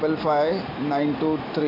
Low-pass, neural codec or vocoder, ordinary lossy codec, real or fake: 5.4 kHz; none; AAC, 24 kbps; real